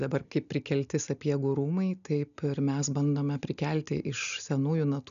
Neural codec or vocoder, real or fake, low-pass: none; real; 7.2 kHz